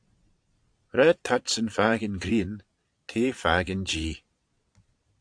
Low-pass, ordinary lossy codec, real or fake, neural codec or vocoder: 9.9 kHz; AAC, 64 kbps; fake; vocoder, 22.05 kHz, 80 mel bands, Vocos